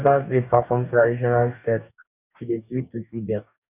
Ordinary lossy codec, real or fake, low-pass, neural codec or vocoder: none; fake; 3.6 kHz; codec, 44.1 kHz, 2.6 kbps, DAC